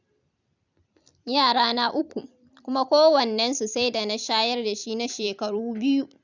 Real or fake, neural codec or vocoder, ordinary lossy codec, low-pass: fake; vocoder, 44.1 kHz, 128 mel bands every 256 samples, BigVGAN v2; none; 7.2 kHz